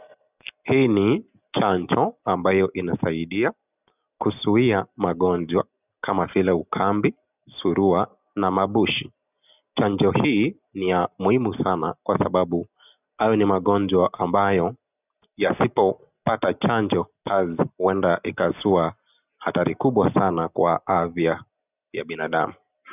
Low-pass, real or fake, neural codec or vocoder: 3.6 kHz; real; none